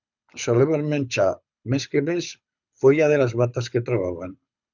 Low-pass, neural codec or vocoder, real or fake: 7.2 kHz; codec, 24 kHz, 6 kbps, HILCodec; fake